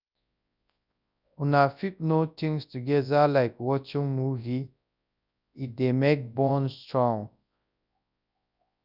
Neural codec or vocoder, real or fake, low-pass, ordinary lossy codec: codec, 24 kHz, 0.9 kbps, WavTokenizer, large speech release; fake; 5.4 kHz; none